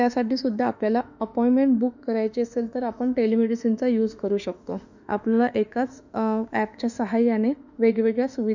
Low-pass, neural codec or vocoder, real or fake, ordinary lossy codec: 7.2 kHz; autoencoder, 48 kHz, 32 numbers a frame, DAC-VAE, trained on Japanese speech; fake; none